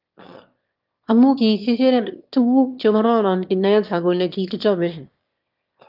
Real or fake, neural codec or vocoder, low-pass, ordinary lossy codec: fake; autoencoder, 22.05 kHz, a latent of 192 numbers a frame, VITS, trained on one speaker; 5.4 kHz; Opus, 24 kbps